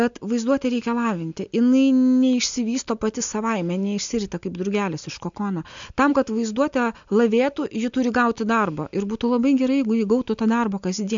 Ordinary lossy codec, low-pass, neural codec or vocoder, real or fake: MP3, 64 kbps; 7.2 kHz; none; real